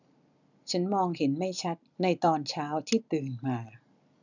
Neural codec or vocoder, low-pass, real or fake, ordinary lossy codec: none; 7.2 kHz; real; none